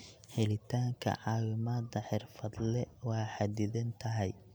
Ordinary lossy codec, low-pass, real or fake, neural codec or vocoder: none; none; real; none